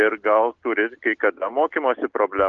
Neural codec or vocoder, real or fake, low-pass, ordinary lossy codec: none; real; 7.2 kHz; Opus, 16 kbps